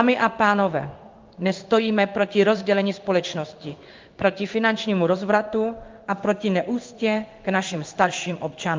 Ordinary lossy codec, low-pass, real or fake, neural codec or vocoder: Opus, 24 kbps; 7.2 kHz; fake; codec, 16 kHz in and 24 kHz out, 1 kbps, XY-Tokenizer